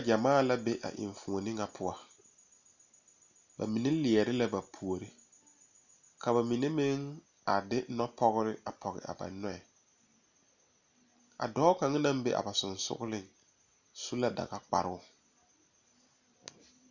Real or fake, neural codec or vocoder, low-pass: real; none; 7.2 kHz